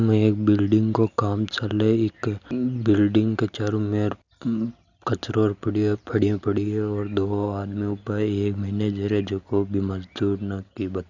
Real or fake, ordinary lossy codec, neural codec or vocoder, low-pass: real; Opus, 64 kbps; none; 7.2 kHz